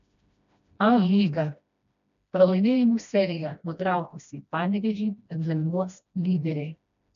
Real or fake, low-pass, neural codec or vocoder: fake; 7.2 kHz; codec, 16 kHz, 1 kbps, FreqCodec, smaller model